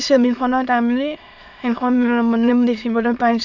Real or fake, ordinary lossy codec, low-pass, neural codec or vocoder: fake; none; 7.2 kHz; autoencoder, 22.05 kHz, a latent of 192 numbers a frame, VITS, trained on many speakers